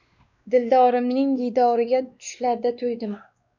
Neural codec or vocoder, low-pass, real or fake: codec, 16 kHz, 2 kbps, X-Codec, WavLM features, trained on Multilingual LibriSpeech; 7.2 kHz; fake